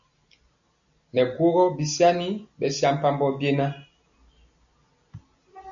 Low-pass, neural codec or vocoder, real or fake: 7.2 kHz; none; real